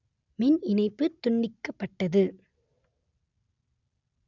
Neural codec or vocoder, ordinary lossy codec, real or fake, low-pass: none; none; real; 7.2 kHz